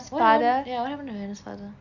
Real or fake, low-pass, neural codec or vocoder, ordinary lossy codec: real; 7.2 kHz; none; none